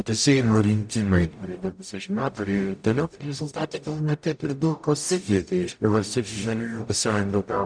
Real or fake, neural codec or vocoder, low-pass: fake; codec, 44.1 kHz, 0.9 kbps, DAC; 9.9 kHz